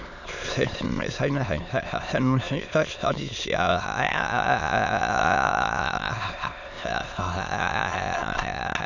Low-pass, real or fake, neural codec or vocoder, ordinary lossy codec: 7.2 kHz; fake; autoencoder, 22.05 kHz, a latent of 192 numbers a frame, VITS, trained on many speakers; none